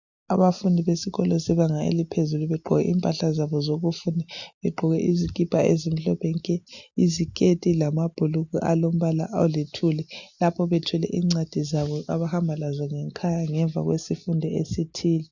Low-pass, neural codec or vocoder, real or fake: 7.2 kHz; none; real